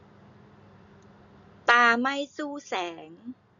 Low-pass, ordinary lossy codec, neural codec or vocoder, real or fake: 7.2 kHz; none; none; real